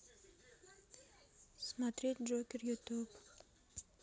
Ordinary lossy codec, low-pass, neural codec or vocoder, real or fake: none; none; none; real